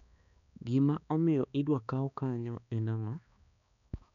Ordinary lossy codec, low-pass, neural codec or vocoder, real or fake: none; 7.2 kHz; codec, 16 kHz, 2 kbps, X-Codec, HuBERT features, trained on balanced general audio; fake